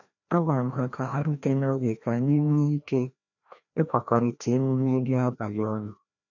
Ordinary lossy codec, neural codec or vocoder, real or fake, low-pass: none; codec, 16 kHz, 1 kbps, FreqCodec, larger model; fake; 7.2 kHz